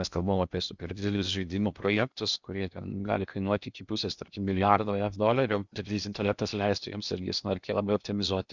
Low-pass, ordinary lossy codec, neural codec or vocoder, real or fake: 7.2 kHz; Opus, 64 kbps; codec, 16 kHz in and 24 kHz out, 0.8 kbps, FocalCodec, streaming, 65536 codes; fake